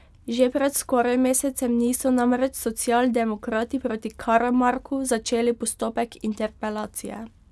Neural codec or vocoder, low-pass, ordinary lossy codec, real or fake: none; none; none; real